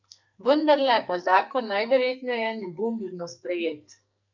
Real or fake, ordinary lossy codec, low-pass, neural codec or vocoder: fake; none; 7.2 kHz; codec, 44.1 kHz, 2.6 kbps, SNAC